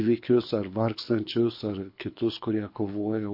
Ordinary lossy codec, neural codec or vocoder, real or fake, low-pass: MP3, 32 kbps; codec, 24 kHz, 6 kbps, HILCodec; fake; 5.4 kHz